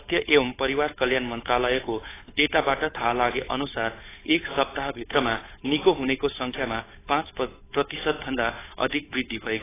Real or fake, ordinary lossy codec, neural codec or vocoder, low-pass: fake; AAC, 16 kbps; codec, 16 kHz, 8 kbps, FunCodec, trained on Chinese and English, 25 frames a second; 3.6 kHz